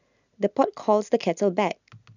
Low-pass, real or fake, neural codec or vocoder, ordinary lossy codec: 7.2 kHz; real; none; none